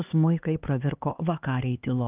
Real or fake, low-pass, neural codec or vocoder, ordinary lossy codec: fake; 3.6 kHz; codec, 16 kHz, 2 kbps, X-Codec, HuBERT features, trained on LibriSpeech; Opus, 24 kbps